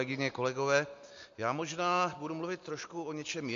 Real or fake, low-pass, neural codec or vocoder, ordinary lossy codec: real; 7.2 kHz; none; MP3, 48 kbps